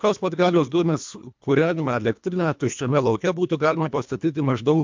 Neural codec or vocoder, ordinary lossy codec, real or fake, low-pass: codec, 24 kHz, 1.5 kbps, HILCodec; MP3, 64 kbps; fake; 7.2 kHz